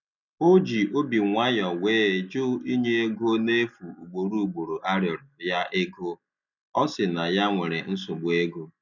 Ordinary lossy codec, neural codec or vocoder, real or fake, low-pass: none; none; real; 7.2 kHz